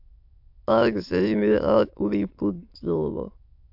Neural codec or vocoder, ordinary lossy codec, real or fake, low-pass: autoencoder, 22.05 kHz, a latent of 192 numbers a frame, VITS, trained on many speakers; AAC, 48 kbps; fake; 5.4 kHz